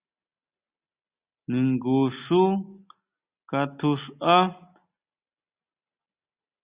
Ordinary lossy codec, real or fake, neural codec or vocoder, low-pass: Opus, 64 kbps; real; none; 3.6 kHz